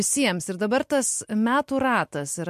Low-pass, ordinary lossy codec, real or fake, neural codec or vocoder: 14.4 kHz; MP3, 64 kbps; real; none